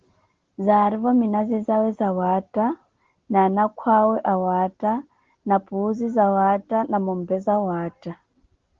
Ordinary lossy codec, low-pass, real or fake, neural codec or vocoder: Opus, 16 kbps; 7.2 kHz; real; none